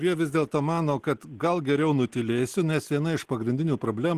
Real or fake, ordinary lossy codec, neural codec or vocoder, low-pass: real; Opus, 16 kbps; none; 14.4 kHz